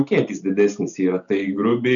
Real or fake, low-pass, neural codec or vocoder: real; 7.2 kHz; none